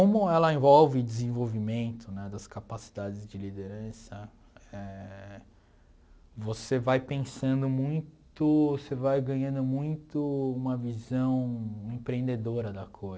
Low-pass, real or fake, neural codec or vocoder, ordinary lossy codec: none; real; none; none